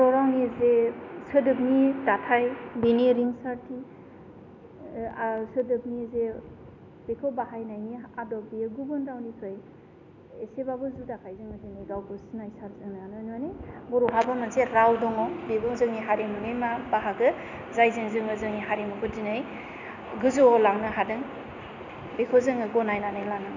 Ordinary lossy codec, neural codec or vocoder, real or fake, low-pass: none; none; real; 7.2 kHz